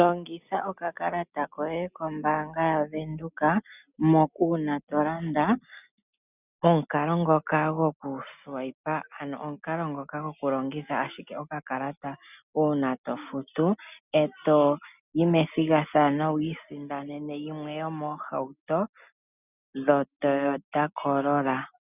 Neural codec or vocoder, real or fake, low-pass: none; real; 3.6 kHz